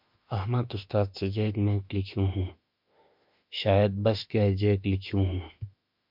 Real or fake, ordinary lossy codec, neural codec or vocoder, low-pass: fake; MP3, 48 kbps; autoencoder, 48 kHz, 32 numbers a frame, DAC-VAE, trained on Japanese speech; 5.4 kHz